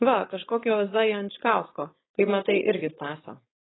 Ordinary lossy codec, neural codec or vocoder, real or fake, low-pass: AAC, 16 kbps; codec, 16 kHz, 4.8 kbps, FACodec; fake; 7.2 kHz